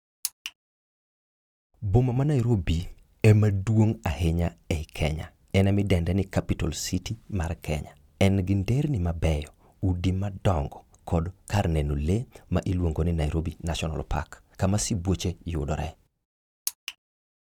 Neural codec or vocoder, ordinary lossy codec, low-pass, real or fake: none; none; 19.8 kHz; real